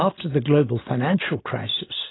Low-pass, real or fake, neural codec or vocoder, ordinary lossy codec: 7.2 kHz; real; none; AAC, 16 kbps